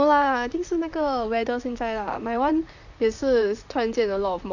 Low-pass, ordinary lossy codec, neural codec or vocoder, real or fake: 7.2 kHz; none; vocoder, 44.1 kHz, 128 mel bands, Pupu-Vocoder; fake